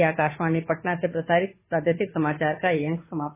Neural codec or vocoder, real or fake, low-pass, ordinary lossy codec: codec, 16 kHz, 2 kbps, FunCodec, trained on Chinese and English, 25 frames a second; fake; 3.6 kHz; MP3, 16 kbps